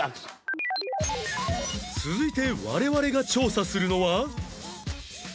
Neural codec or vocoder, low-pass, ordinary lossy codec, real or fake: none; none; none; real